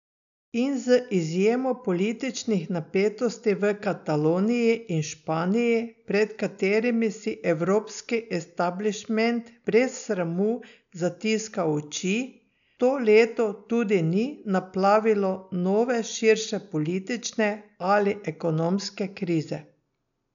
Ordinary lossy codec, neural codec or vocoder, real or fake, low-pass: none; none; real; 7.2 kHz